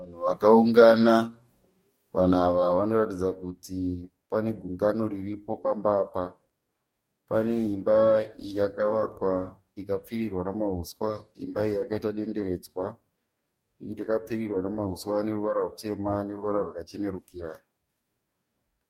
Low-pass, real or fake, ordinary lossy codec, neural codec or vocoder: 19.8 kHz; fake; MP3, 64 kbps; codec, 44.1 kHz, 2.6 kbps, DAC